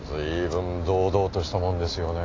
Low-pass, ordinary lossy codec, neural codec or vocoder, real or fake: 7.2 kHz; AAC, 32 kbps; none; real